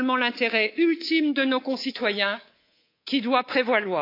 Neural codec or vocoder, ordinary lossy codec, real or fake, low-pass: codec, 24 kHz, 3.1 kbps, DualCodec; AAC, 32 kbps; fake; 5.4 kHz